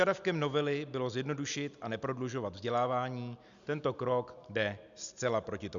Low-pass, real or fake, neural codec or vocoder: 7.2 kHz; real; none